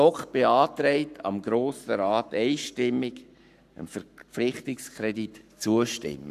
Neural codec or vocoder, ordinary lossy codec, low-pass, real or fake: codec, 44.1 kHz, 7.8 kbps, Pupu-Codec; none; 14.4 kHz; fake